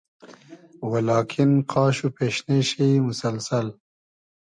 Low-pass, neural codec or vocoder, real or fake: 9.9 kHz; none; real